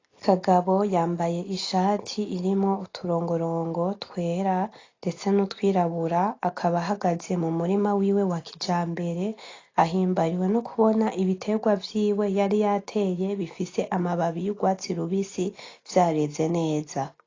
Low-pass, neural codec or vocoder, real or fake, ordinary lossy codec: 7.2 kHz; none; real; AAC, 32 kbps